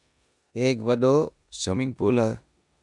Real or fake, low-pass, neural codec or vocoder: fake; 10.8 kHz; codec, 16 kHz in and 24 kHz out, 0.9 kbps, LongCat-Audio-Codec, four codebook decoder